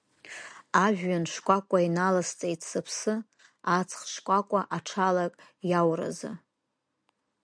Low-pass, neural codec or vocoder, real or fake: 9.9 kHz; none; real